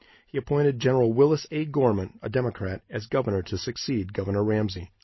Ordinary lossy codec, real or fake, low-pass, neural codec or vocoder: MP3, 24 kbps; real; 7.2 kHz; none